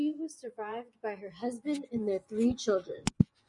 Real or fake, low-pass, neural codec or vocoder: fake; 10.8 kHz; vocoder, 24 kHz, 100 mel bands, Vocos